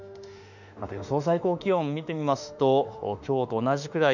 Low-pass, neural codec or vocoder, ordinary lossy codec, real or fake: 7.2 kHz; autoencoder, 48 kHz, 32 numbers a frame, DAC-VAE, trained on Japanese speech; none; fake